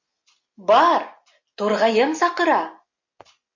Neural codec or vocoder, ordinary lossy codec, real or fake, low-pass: none; MP3, 64 kbps; real; 7.2 kHz